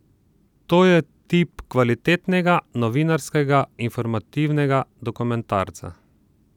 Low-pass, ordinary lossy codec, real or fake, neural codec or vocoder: 19.8 kHz; none; fake; vocoder, 44.1 kHz, 128 mel bands every 256 samples, BigVGAN v2